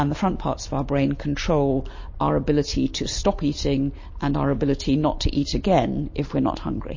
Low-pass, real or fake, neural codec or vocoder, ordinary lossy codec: 7.2 kHz; real; none; MP3, 32 kbps